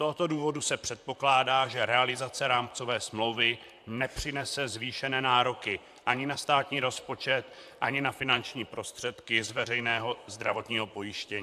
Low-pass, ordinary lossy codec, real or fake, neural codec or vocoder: 14.4 kHz; AAC, 96 kbps; fake; vocoder, 44.1 kHz, 128 mel bands, Pupu-Vocoder